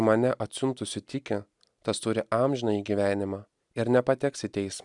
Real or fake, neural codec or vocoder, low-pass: real; none; 10.8 kHz